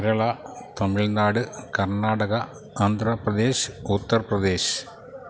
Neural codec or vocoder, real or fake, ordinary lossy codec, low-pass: none; real; none; none